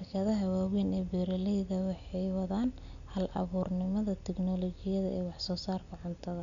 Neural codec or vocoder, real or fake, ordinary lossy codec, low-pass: none; real; none; 7.2 kHz